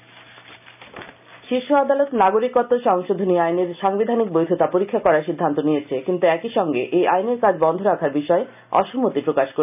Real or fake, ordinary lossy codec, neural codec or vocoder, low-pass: real; none; none; 3.6 kHz